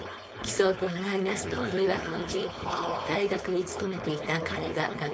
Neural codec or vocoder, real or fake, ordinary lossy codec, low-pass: codec, 16 kHz, 4.8 kbps, FACodec; fake; none; none